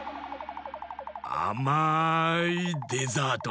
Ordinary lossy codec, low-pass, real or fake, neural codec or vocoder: none; none; real; none